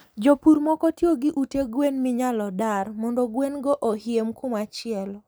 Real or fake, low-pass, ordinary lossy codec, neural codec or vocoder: real; none; none; none